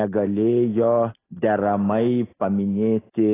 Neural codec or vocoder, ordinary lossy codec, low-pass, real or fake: none; AAC, 16 kbps; 3.6 kHz; real